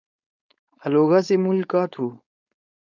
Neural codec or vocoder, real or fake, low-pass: codec, 16 kHz, 4.8 kbps, FACodec; fake; 7.2 kHz